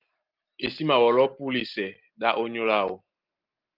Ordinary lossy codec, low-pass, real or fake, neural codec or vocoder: Opus, 24 kbps; 5.4 kHz; real; none